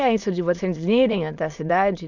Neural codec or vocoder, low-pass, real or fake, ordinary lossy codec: autoencoder, 22.05 kHz, a latent of 192 numbers a frame, VITS, trained on many speakers; 7.2 kHz; fake; none